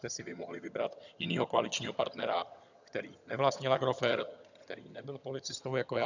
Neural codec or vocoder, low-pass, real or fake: vocoder, 22.05 kHz, 80 mel bands, HiFi-GAN; 7.2 kHz; fake